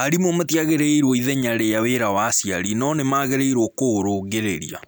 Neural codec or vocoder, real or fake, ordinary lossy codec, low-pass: none; real; none; none